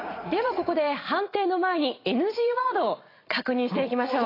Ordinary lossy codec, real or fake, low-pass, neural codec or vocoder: AAC, 24 kbps; fake; 5.4 kHz; vocoder, 44.1 kHz, 80 mel bands, Vocos